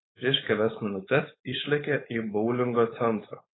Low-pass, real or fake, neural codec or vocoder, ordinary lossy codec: 7.2 kHz; fake; codec, 16 kHz, 4.8 kbps, FACodec; AAC, 16 kbps